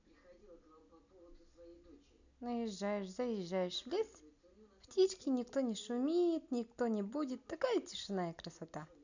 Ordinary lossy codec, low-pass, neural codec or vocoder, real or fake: none; 7.2 kHz; none; real